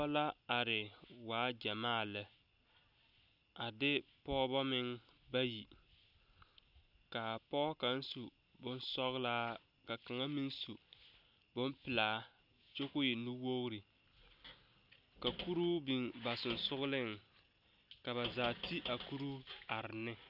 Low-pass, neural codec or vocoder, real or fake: 5.4 kHz; none; real